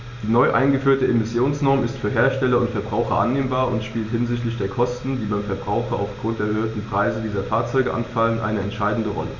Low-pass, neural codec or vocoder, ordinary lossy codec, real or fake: 7.2 kHz; none; none; real